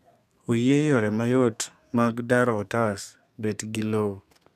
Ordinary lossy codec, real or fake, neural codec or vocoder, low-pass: none; fake; codec, 32 kHz, 1.9 kbps, SNAC; 14.4 kHz